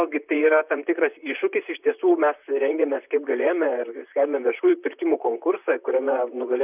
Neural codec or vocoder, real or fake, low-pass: vocoder, 44.1 kHz, 128 mel bands, Pupu-Vocoder; fake; 3.6 kHz